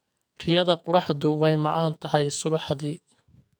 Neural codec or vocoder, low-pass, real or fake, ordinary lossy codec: codec, 44.1 kHz, 2.6 kbps, SNAC; none; fake; none